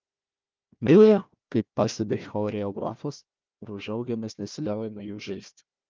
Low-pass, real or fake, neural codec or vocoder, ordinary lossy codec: 7.2 kHz; fake; codec, 16 kHz, 1 kbps, FunCodec, trained on Chinese and English, 50 frames a second; Opus, 24 kbps